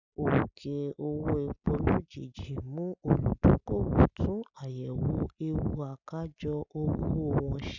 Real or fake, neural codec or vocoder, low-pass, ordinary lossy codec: real; none; 7.2 kHz; none